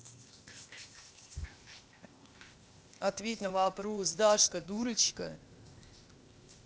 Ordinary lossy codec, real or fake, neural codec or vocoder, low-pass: none; fake; codec, 16 kHz, 0.8 kbps, ZipCodec; none